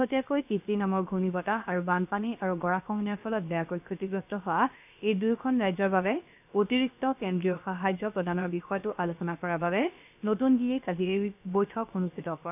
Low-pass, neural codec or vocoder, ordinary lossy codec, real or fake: 3.6 kHz; codec, 16 kHz, about 1 kbps, DyCAST, with the encoder's durations; none; fake